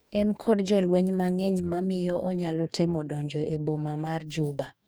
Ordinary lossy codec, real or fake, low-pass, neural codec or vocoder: none; fake; none; codec, 44.1 kHz, 2.6 kbps, DAC